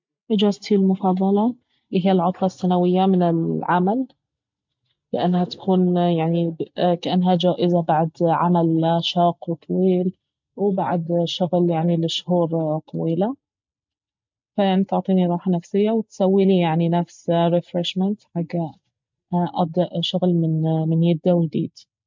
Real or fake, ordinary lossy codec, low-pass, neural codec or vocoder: real; MP3, 64 kbps; 7.2 kHz; none